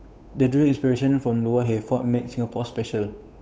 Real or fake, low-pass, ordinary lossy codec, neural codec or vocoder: fake; none; none; codec, 16 kHz, 8 kbps, FunCodec, trained on Chinese and English, 25 frames a second